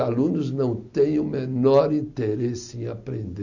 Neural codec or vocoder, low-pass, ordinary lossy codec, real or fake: none; 7.2 kHz; none; real